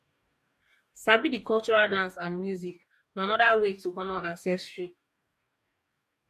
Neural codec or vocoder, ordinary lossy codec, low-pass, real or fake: codec, 44.1 kHz, 2.6 kbps, DAC; MP3, 64 kbps; 14.4 kHz; fake